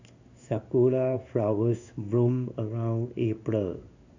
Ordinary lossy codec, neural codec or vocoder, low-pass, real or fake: AAC, 48 kbps; codec, 16 kHz in and 24 kHz out, 1 kbps, XY-Tokenizer; 7.2 kHz; fake